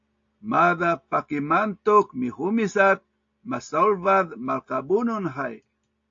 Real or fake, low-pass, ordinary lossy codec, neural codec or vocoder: real; 7.2 kHz; AAC, 48 kbps; none